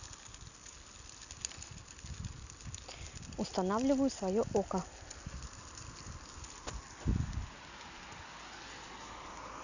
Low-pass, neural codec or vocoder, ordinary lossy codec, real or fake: 7.2 kHz; none; none; real